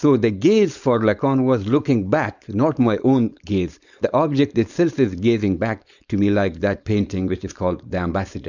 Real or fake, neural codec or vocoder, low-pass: fake; codec, 16 kHz, 4.8 kbps, FACodec; 7.2 kHz